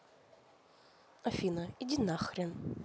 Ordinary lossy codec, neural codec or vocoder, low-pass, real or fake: none; none; none; real